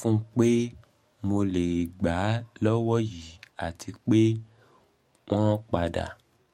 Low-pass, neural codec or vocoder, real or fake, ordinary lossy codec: 19.8 kHz; codec, 44.1 kHz, 7.8 kbps, DAC; fake; MP3, 64 kbps